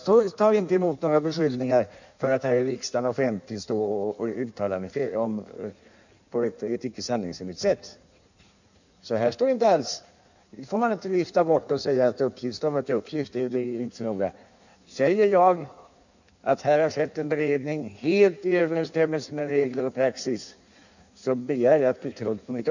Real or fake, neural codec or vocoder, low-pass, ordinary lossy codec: fake; codec, 16 kHz in and 24 kHz out, 1.1 kbps, FireRedTTS-2 codec; 7.2 kHz; none